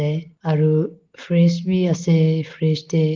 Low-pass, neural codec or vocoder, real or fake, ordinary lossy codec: 7.2 kHz; none; real; Opus, 16 kbps